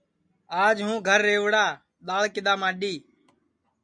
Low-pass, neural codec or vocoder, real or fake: 9.9 kHz; none; real